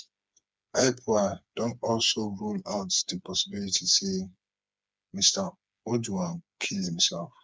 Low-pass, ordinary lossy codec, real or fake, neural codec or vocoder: none; none; fake; codec, 16 kHz, 4 kbps, FreqCodec, smaller model